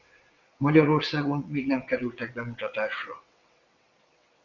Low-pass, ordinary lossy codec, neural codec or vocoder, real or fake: 7.2 kHz; Opus, 64 kbps; vocoder, 22.05 kHz, 80 mel bands, WaveNeXt; fake